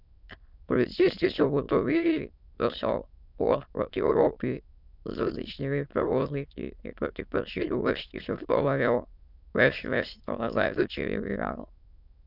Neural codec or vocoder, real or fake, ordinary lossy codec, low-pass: autoencoder, 22.05 kHz, a latent of 192 numbers a frame, VITS, trained on many speakers; fake; none; 5.4 kHz